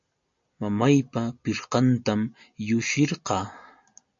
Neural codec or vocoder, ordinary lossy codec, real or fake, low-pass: none; MP3, 48 kbps; real; 7.2 kHz